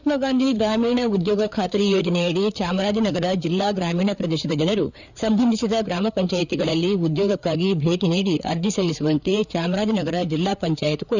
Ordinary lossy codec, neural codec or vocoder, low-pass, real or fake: none; codec, 16 kHz, 4 kbps, FreqCodec, larger model; 7.2 kHz; fake